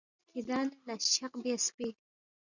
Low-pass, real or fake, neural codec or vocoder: 7.2 kHz; real; none